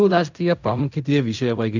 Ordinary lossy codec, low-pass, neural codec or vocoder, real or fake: none; 7.2 kHz; codec, 16 kHz in and 24 kHz out, 0.4 kbps, LongCat-Audio-Codec, fine tuned four codebook decoder; fake